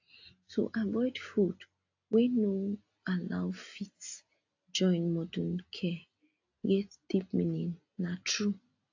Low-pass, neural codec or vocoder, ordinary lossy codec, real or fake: 7.2 kHz; none; AAC, 48 kbps; real